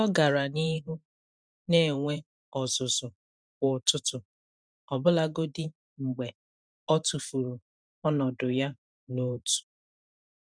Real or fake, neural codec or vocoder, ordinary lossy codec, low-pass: fake; vocoder, 44.1 kHz, 128 mel bands every 512 samples, BigVGAN v2; none; 9.9 kHz